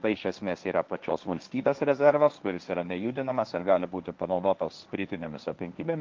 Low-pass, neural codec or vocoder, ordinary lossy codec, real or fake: 7.2 kHz; codec, 16 kHz, 1.1 kbps, Voila-Tokenizer; Opus, 24 kbps; fake